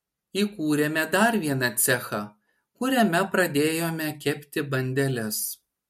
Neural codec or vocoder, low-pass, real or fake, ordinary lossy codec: none; 19.8 kHz; real; MP3, 64 kbps